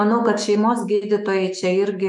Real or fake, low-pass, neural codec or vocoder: fake; 10.8 kHz; autoencoder, 48 kHz, 128 numbers a frame, DAC-VAE, trained on Japanese speech